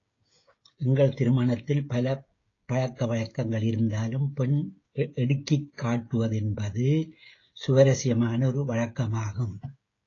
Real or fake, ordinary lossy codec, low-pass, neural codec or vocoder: fake; AAC, 32 kbps; 7.2 kHz; codec, 16 kHz, 16 kbps, FreqCodec, smaller model